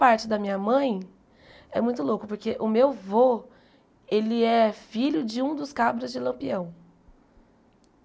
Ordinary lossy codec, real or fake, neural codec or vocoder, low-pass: none; real; none; none